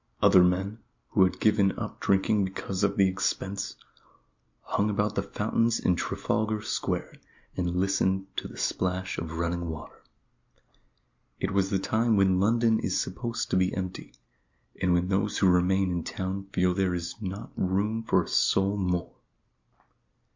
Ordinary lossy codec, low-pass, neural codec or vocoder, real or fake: MP3, 48 kbps; 7.2 kHz; none; real